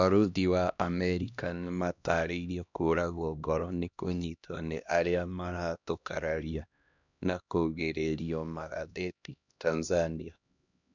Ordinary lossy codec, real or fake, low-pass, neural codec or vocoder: none; fake; 7.2 kHz; codec, 16 kHz, 1 kbps, X-Codec, HuBERT features, trained on LibriSpeech